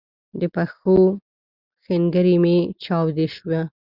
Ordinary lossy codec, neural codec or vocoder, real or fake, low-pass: Opus, 64 kbps; none; real; 5.4 kHz